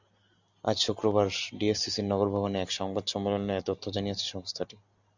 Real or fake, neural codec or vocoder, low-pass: real; none; 7.2 kHz